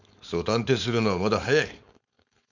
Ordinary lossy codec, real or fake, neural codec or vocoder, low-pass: none; fake; codec, 16 kHz, 4.8 kbps, FACodec; 7.2 kHz